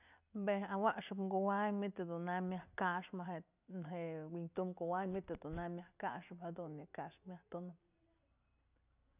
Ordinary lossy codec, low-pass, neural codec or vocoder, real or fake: none; 3.6 kHz; none; real